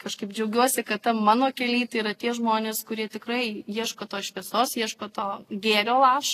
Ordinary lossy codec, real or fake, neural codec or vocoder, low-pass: AAC, 48 kbps; real; none; 14.4 kHz